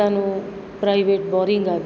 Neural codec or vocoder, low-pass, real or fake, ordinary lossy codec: none; none; real; none